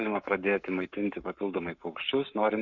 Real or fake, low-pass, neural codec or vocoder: fake; 7.2 kHz; codec, 44.1 kHz, 7.8 kbps, Pupu-Codec